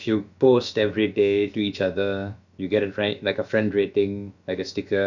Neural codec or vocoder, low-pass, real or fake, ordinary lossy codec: codec, 16 kHz, about 1 kbps, DyCAST, with the encoder's durations; 7.2 kHz; fake; none